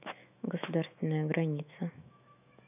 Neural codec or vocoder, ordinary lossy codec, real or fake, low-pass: autoencoder, 48 kHz, 128 numbers a frame, DAC-VAE, trained on Japanese speech; none; fake; 3.6 kHz